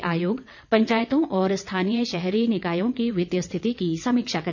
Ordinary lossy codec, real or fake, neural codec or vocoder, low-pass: none; fake; vocoder, 22.05 kHz, 80 mel bands, WaveNeXt; 7.2 kHz